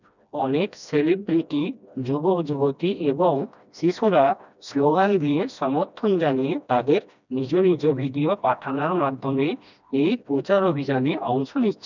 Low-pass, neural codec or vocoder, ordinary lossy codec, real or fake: 7.2 kHz; codec, 16 kHz, 1 kbps, FreqCodec, smaller model; none; fake